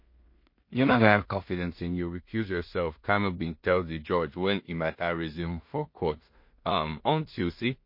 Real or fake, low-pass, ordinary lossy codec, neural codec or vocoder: fake; 5.4 kHz; MP3, 32 kbps; codec, 16 kHz in and 24 kHz out, 0.4 kbps, LongCat-Audio-Codec, two codebook decoder